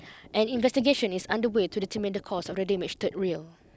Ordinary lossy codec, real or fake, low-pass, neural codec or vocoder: none; real; none; none